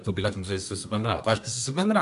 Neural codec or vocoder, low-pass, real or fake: codec, 24 kHz, 1 kbps, SNAC; 10.8 kHz; fake